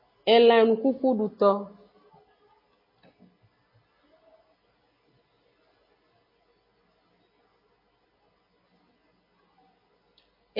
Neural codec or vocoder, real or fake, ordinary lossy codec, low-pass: none; real; MP3, 24 kbps; 5.4 kHz